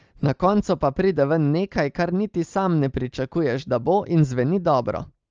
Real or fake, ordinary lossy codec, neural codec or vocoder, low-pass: real; Opus, 24 kbps; none; 7.2 kHz